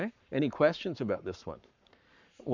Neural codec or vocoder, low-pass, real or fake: codec, 44.1 kHz, 7.8 kbps, Pupu-Codec; 7.2 kHz; fake